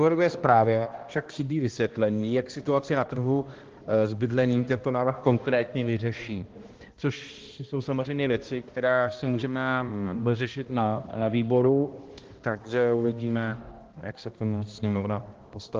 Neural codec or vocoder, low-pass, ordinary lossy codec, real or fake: codec, 16 kHz, 1 kbps, X-Codec, HuBERT features, trained on balanced general audio; 7.2 kHz; Opus, 16 kbps; fake